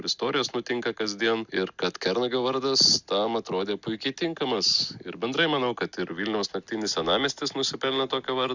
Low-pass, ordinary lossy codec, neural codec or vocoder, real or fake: 7.2 kHz; Opus, 64 kbps; none; real